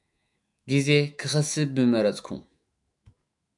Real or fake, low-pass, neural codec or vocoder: fake; 10.8 kHz; autoencoder, 48 kHz, 128 numbers a frame, DAC-VAE, trained on Japanese speech